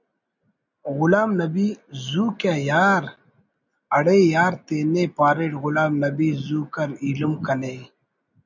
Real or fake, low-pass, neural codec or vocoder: real; 7.2 kHz; none